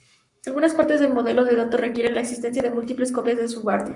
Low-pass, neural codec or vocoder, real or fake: 10.8 kHz; codec, 44.1 kHz, 7.8 kbps, Pupu-Codec; fake